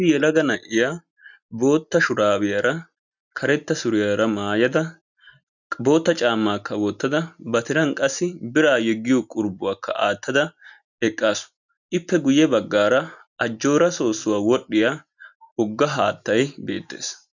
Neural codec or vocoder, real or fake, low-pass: none; real; 7.2 kHz